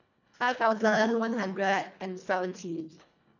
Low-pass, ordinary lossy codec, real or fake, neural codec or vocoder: 7.2 kHz; none; fake; codec, 24 kHz, 1.5 kbps, HILCodec